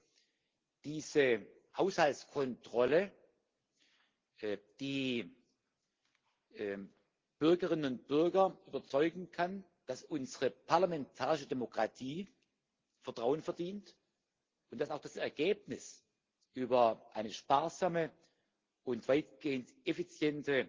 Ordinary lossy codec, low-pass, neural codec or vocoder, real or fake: Opus, 16 kbps; 7.2 kHz; none; real